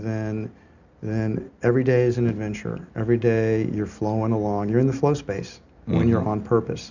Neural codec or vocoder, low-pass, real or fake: none; 7.2 kHz; real